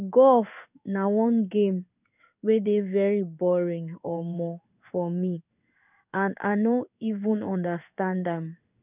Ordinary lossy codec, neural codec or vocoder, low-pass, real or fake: none; codec, 16 kHz in and 24 kHz out, 1 kbps, XY-Tokenizer; 3.6 kHz; fake